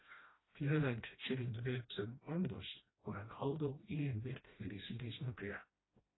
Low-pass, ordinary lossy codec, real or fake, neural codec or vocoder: 7.2 kHz; AAC, 16 kbps; fake; codec, 16 kHz, 1 kbps, FreqCodec, smaller model